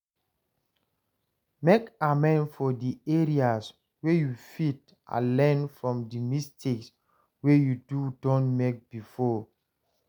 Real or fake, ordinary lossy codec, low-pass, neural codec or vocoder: real; none; none; none